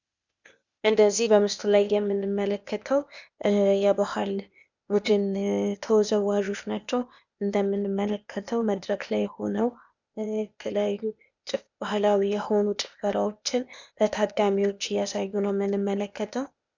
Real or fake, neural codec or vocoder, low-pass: fake; codec, 16 kHz, 0.8 kbps, ZipCodec; 7.2 kHz